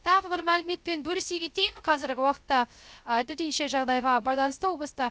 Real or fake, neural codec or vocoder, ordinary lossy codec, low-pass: fake; codec, 16 kHz, 0.3 kbps, FocalCodec; none; none